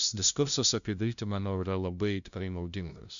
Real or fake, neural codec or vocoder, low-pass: fake; codec, 16 kHz, 0.5 kbps, FunCodec, trained on LibriTTS, 25 frames a second; 7.2 kHz